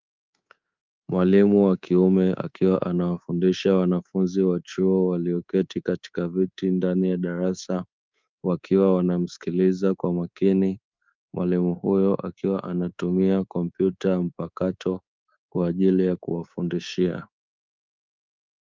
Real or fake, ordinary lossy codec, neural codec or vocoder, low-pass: fake; Opus, 24 kbps; codec, 24 kHz, 3.1 kbps, DualCodec; 7.2 kHz